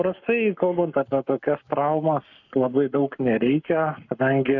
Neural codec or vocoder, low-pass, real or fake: codec, 44.1 kHz, 7.8 kbps, Pupu-Codec; 7.2 kHz; fake